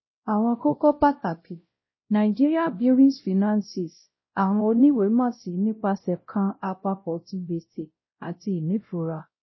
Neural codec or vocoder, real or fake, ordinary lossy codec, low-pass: codec, 16 kHz, 0.5 kbps, X-Codec, WavLM features, trained on Multilingual LibriSpeech; fake; MP3, 24 kbps; 7.2 kHz